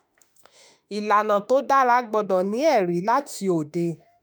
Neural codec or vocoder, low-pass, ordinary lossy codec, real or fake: autoencoder, 48 kHz, 32 numbers a frame, DAC-VAE, trained on Japanese speech; none; none; fake